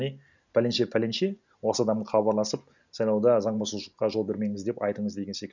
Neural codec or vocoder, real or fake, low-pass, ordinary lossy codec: none; real; 7.2 kHz; none